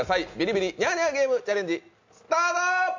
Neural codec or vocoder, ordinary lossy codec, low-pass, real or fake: none; MP3, 64 kbps; 7.2 kHz; real